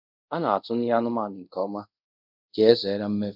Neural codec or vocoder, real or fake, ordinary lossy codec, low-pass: codec, 24 kHz, 0.5 kbps, DualCodec; fake; none; 5.4 kHz